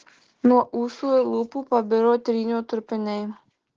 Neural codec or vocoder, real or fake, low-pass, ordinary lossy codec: none; real; 7.2 kHz; Opus, 16 kbps